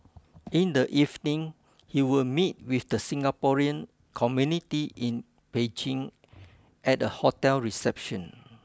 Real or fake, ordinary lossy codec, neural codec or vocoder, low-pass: real; none; none; none